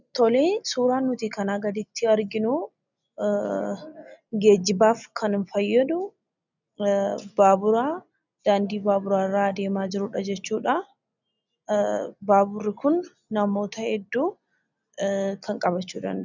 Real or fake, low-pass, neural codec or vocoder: real; 7.2 kHz; none